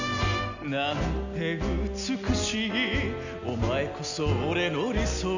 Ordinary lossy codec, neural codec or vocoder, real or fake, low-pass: none; none; real; 7.2 kHz